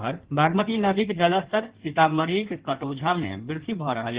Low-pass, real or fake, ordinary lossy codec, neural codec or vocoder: 3.6 kHz; fake; Opus, 16 kbps; codec, 16 kHz in and 24 kHz out, 1.1 kbps, FireRedTTS-2 codec